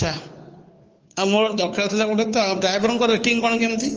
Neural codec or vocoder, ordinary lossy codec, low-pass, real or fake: codec, 16 kHz, 2 kbps, FunCodec, trained on Chinese and English, 25 frames a second; Opus, 24 kbps; 7.2 kHz; fake